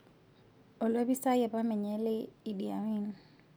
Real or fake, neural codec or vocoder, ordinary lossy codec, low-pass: real; none; none; none